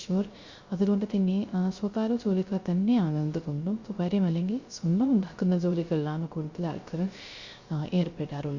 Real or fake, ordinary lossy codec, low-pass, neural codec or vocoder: fake; Opus, 64 kbps; 7.2 kHz; codec, 16 kHz, 0.3 kbps, FocalCodec